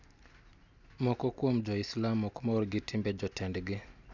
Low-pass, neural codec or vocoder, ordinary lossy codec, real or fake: 7.2 kHz; none; none; real